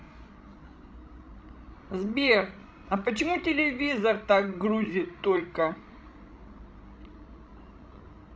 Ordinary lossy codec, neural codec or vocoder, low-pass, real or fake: none; codec, 16 kHz, 16 kbps, FreqCodec, larger model; none; fake